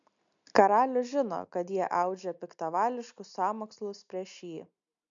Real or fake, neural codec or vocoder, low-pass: real; none; 7.2 kHz